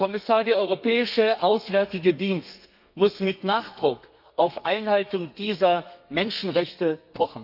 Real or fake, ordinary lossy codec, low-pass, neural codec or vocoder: fake; none; 5.4 kHz; codec, 32 kHz, 1.9 kbps, SNAC